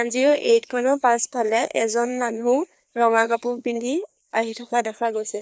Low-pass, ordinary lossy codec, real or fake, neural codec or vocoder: none; none; fake; codec, 16 kHz, 2 kbps, FreqCodec, larger model